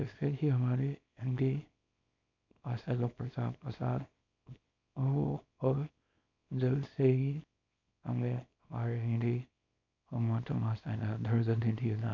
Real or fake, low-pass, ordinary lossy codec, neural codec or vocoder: fake; 7.2 kHz; none; codec, 24 kHz, 0.9 kbps, WavTokenizer, small release